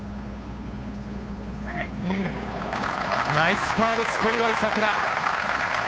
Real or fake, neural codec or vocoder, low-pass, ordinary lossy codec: fake; codec, 16 kHz, 2 kbps, FunCodec, trained on Chinese and English, 25 frames a second; none; none